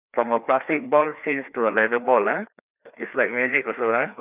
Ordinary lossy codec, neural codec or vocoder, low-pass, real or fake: none; codec, 16 kHz, 2 kbps, FreqCodec, larger model; 3.6 kHz; fake